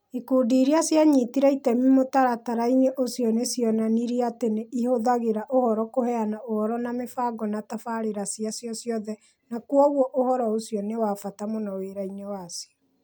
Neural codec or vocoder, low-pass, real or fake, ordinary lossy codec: none; none; real; none